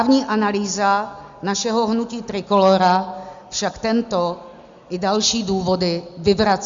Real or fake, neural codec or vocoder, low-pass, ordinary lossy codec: real; none; 7.2 kHz; Opus, 64 kbps